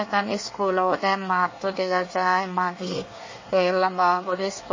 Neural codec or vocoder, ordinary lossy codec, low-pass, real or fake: codec, 24 kHz, 1 kbps, SNAC; MP3, 32 kbps; 7.2 kHz; fake